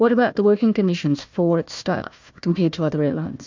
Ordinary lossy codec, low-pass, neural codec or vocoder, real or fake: MP3, 64 kbps; 7.2 kHz; codec, 16 kHz, 1 kbps, FunCodec, trained on Chinese and English, 50 frames a second; fake